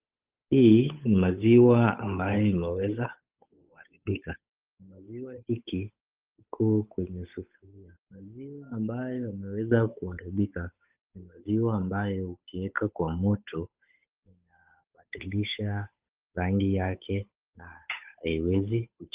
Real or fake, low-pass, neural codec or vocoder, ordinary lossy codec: fake; 3.6 kHz; codec, 16 kHz, 8 kbps, FunCodec, trained on Chinese and English, 25 frames a second; Opus, 24 kbps